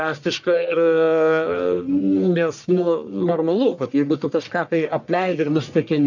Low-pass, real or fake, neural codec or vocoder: 7.2 kHz; fake; codec, 44.1 kHz, 1.7 kbps, Pupu-Codec